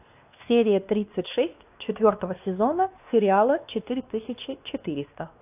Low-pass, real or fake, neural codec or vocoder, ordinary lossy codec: 3.6 kHz; fake; codec, 16 kHz, 2 kbps, X-Codec, WavLM features, trained on Multilingual LibriSpeech; AAC, 32 kbps